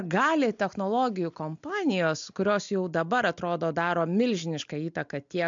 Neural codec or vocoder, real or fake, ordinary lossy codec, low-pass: none; real; MP3, 64 kbps; 7.2 kHz